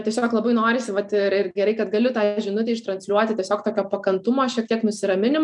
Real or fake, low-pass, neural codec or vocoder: real; 10.8 kHz; none